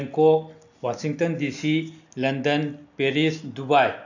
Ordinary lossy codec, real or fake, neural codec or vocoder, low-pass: none; real; none; 7.2 kHz